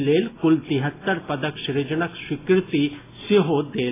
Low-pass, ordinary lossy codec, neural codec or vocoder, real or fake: 3.6 kHz; AAC, 16 kbps; none; real